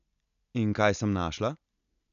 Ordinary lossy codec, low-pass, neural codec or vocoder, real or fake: none; 7.2 kHz; none; real